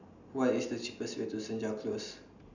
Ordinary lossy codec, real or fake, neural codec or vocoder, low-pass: none; real; none; 7.2 kHz